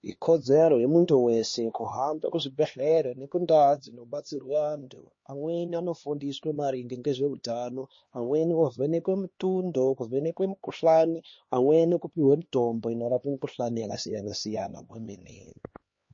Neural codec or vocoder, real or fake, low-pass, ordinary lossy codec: codec, 16 kHz, 2 kbps, X-Codec, HuBERT features, trained on LibriSpeech; fake; 7.2 kHz; MP3, 32 kbps